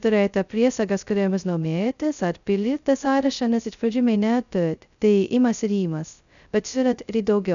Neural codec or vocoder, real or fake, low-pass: codec, 16 kHz, 0.2 kbps, FocalCodec; fake; 7.2 kHz